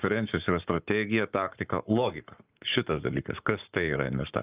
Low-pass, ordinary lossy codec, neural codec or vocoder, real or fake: 3.6 kHz; Opus, 24 kbps; codec, 44.1 kHz, 7.8 kbps, Pupu-Codec; fake